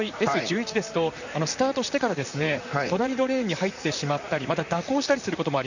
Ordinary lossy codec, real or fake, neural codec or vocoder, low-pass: none; fake; vocoder, 44.1 kHz, 128 mel bands, Pupu-Vocoder; 7.2 kHz